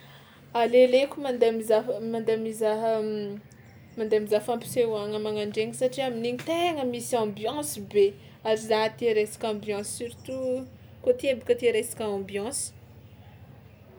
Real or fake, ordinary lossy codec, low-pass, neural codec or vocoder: real; none; none; none